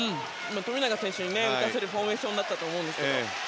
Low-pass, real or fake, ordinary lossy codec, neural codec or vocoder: none; real; none; none